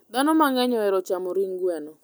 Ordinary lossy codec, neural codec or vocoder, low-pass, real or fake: none; none; none; real